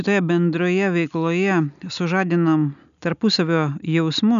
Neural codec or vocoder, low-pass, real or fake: none; 7.2 kHz; real